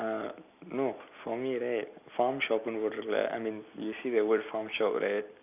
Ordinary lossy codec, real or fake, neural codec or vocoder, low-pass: none; real; none; 3.6 kHz